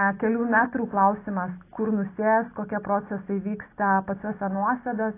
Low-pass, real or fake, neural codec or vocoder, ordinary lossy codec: 3.6 kHz; real; none; AAC, 24 kbps